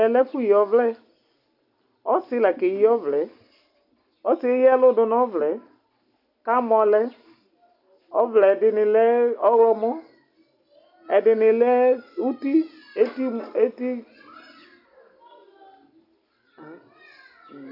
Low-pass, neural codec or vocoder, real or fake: 5.4 kHz; none; real